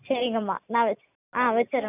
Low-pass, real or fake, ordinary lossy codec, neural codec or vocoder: 3.6 kHz; real; none; none